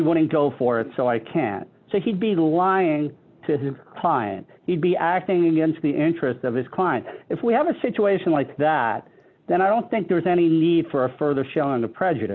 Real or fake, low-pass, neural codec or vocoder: real; 7.2 kHz; none